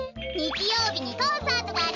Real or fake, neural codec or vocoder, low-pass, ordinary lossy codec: real; none; 7.2 kHz; none